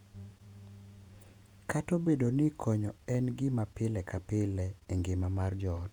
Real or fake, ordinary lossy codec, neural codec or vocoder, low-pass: fake; none; vocoder, 48 kHz, 128 mel bands, Vocos; 19.8 kHz